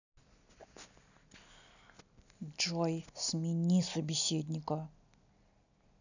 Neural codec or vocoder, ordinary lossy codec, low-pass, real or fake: none; none; 7.2 kHz; real